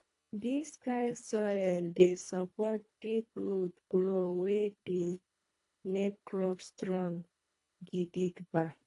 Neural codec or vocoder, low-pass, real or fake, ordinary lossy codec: codec, 24 kHz, 1.5 kbps, HILCodec; 10.8 kHz; fake; AAC, 48 kbps